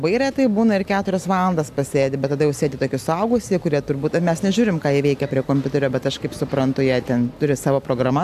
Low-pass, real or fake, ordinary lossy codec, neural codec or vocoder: 14.4 kHz; real; AAC, 96 kbps; none